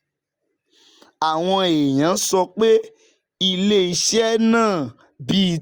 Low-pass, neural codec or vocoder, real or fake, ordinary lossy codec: 19.8 kHz; none; real; Opus, 64 kbps